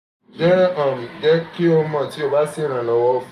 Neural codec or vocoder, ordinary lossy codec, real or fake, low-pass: none; none; real; 14.4 kHz